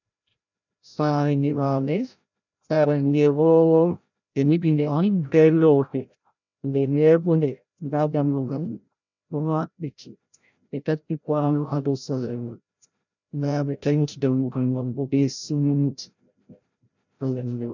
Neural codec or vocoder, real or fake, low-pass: codec, 16 kHz, 0.5 kbps, FreqCodec, larger model; fake; 7.2 kHz